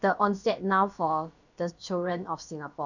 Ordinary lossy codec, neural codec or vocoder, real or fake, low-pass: none; codec, 16 kHz, about 1 kbps, DyCAST, with the encoder's durations; fake; 7.2 kHz